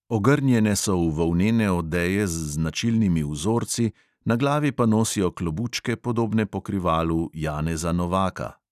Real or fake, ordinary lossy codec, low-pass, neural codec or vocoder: real; none; 14.4 kHz; none